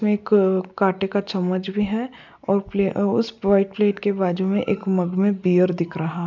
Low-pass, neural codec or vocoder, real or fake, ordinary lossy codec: 7.2 kHz; none; real; none